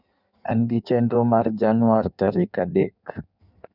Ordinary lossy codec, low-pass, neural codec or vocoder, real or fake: none; 5.4 kHz; codec, 16 kHz in and 24 kHz out, 1.1 kbps, FireRedTTS-2 codec; fake